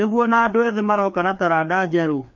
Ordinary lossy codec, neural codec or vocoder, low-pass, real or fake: MP3, 48 kbps; codec, 16 kHz, 2 kbps, FreqCodec, larger model; 7.2 kHz; fake